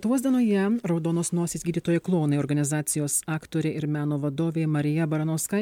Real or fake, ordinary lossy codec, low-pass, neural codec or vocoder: real; MP3, 96 kbps; 19.8 kHz; none